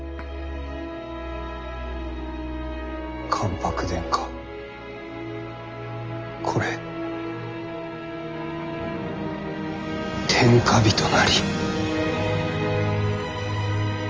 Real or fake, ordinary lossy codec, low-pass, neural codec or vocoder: real; Opus, 24 kbps; 7.2 kHz; none